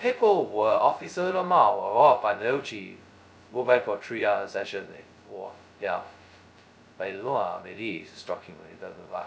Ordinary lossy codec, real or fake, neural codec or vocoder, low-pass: none; fake; codec, 16 kHz, 0.2 kbps, FocalCodec; none